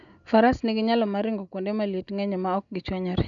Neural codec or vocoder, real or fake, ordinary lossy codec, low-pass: none; real; none; 7.2 kHz